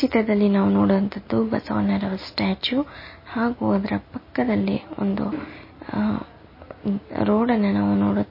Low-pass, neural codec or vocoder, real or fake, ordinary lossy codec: 5.4 kHz; none; real; MP3, 24 kbps